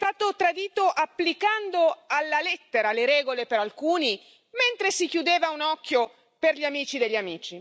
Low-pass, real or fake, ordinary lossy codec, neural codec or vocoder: none; real; none; none